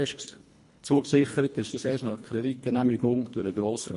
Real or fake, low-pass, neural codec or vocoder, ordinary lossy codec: fake; 10.8 kHz; codec, 24 kHz, 1.5 kbps, HILCodec; MP3, 48 kbps